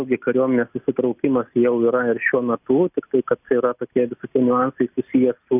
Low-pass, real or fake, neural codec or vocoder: 3.6 kHz; real; none